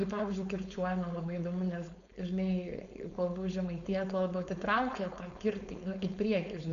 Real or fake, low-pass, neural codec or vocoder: fake; 7.2 kHz; codec, 16 kHz, 4.8 kbps, FACodec